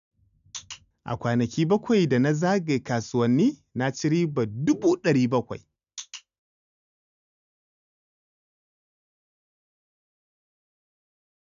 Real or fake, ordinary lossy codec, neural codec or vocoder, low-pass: real; none; none; 7.2 kHz